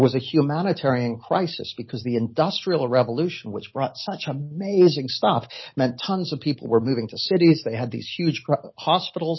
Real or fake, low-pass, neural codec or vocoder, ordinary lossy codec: real; 7.2 kHz; none; MP3, 24 kbps